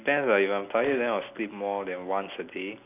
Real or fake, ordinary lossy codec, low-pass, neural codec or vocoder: real; none; 3.6 kHz; none